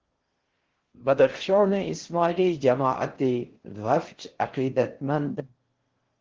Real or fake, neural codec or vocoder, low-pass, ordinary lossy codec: fake; codec, 16 kHz in and 24 kHz out, 0.6 kbps, FocalCodec, streaming, 4096 codes; 7.2 kHz; Opus, 16 kbps